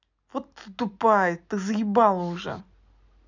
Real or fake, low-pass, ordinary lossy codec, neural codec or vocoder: real; 7.2 kHz; none; none